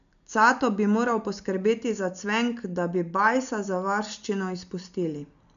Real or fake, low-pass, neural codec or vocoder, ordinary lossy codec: real; 7.2 kHz; none; none